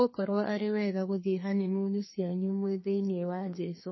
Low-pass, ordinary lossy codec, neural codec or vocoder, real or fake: 7.2 kHz; MP3, 24 kbps; codec, 32 kHz, 1.9 kbps, SNAC; fake